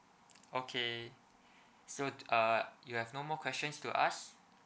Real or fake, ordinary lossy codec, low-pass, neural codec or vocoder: real; none; none; none